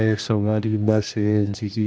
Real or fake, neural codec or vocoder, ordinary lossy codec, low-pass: fake; codec, 16 kHz, 1 kbps, X-Codec, HuBERT features, trained on general audio; none; none